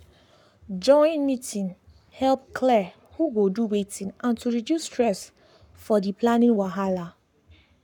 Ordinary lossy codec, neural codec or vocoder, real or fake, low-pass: none; codec, 44.1 kHz, 7.8 kbps, Pupu-Codec; fake; 19.8 kHz